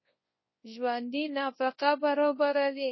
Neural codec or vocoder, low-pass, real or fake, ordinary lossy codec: codec, 24 kHz, 0.9 kbps, WavTokenizer, large speech release; 7.2 kHz; fake; MP3, 24 kbps